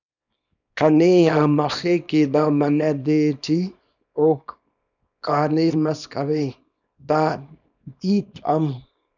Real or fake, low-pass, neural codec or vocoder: fake; 7.2 kHz; codec, 24 kHz, 0.9 kbps, WavTokenizer, small release